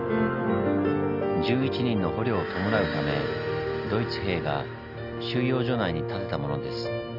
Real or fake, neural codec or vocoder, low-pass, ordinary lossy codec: real; none; 5.4 kHz; none